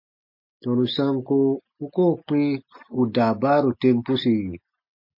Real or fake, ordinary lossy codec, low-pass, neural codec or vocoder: real; MP3, 32 kbps; 5.4 kHz; none